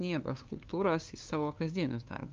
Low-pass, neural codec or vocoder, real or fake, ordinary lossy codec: 7.2 kHz; codec, 16 kHz, 2 kbps, FunCodec, trained on LibriTTS, 25 frames a second; fake; Opus, 24 kbps